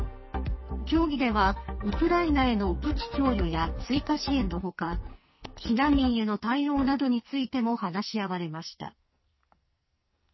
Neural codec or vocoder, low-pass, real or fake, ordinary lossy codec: codec, 44.1 kHz, 2.6 kbps, SNAC; 7.2 kHz; fake; MP3, 24 kbps